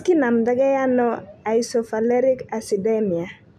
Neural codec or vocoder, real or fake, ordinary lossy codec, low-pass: none; real; none; 14.4 kHz